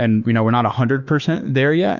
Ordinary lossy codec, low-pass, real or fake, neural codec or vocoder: Opus, 64 kbps; 7.2 kHz; fake; autoencoder, 48 kHz, 32 numbers a frame, DAC-VAE, trained on Japanese speech